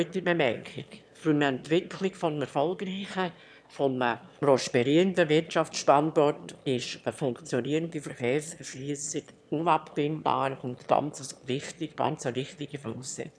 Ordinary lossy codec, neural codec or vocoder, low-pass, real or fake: none; autoencoder, 22.05 kHz, a latent of 192 numbers a frame, VITS, trained on one speaker; none; fake